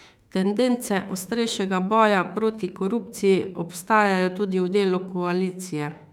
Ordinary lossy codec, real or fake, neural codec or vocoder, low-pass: none; fake; autoencoder, 48 kHz, 32 numbers a frame, DAC-VAE, trained on Japanese speech; 19.8 kHz